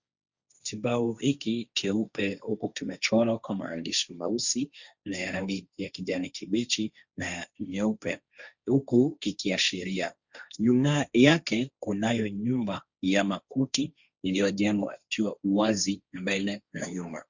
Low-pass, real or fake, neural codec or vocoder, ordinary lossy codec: 7.2 kHz; fake; codec, 16 kHz, 1.1 kbps, Voila-Tokenizer; Opus, 64 kbps